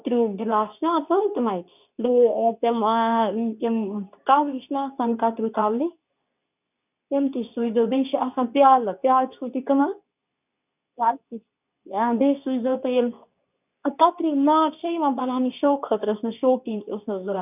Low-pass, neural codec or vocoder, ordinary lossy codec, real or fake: 3.6 kHz; codec, 24 kHz, 0.9 kbps, WavTokenizer, medium speech release version 2; none; fake